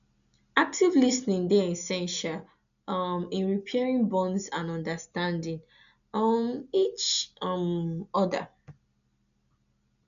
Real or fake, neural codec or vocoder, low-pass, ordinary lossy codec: real; none; 7.2 kHz; none